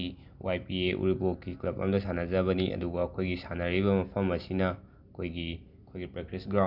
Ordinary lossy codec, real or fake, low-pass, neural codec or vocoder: none; real; 5.4 kHz; none